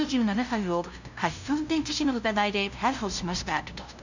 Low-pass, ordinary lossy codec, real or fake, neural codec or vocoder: 7.2 kHz; none; fake; codec, 16 kHz, 0.5 kbps, FunCodec, trained on LibriTTS, 25 frames a second